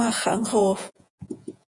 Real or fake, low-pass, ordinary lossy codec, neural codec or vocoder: fake; 10.8 kHz; MP3, 64 kbps; vocoder, 48 kHz, 128 mel bands, Vocos